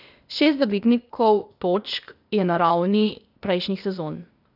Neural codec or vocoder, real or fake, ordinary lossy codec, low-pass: codec, 16 kHz, 0.8 kbps, ZipCodec; fake; none; 5.4 kHz